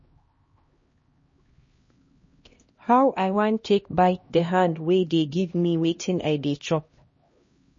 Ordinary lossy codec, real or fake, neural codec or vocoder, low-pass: MP3, 32 kbps; fake; codec, 16 kHz, 1 kbps, X-Codec, HuBERT features, trained on LibriSpeech; 7.2 kHz